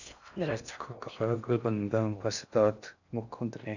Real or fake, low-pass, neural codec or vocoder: fake; 7.2 kHz; codec, 16 kHz in and 24 kHz out, 0.6 kbps, FocalCodec, streaming, 4096 codes